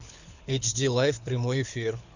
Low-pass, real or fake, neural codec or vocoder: 7.2 kHz; fake; codec, 24 kHz, 6 kbps, HILCodec